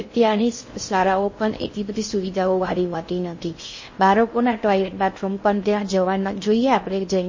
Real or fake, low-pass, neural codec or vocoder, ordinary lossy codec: fake; 7.2 kHz; codec, 16 kHz in and 24 kHz out, 0.6 kbps, FocalCodec, streaming, 4096 codes; MP3, 32 kbps